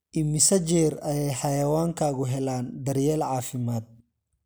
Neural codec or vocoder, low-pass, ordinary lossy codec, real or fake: none; none; none; real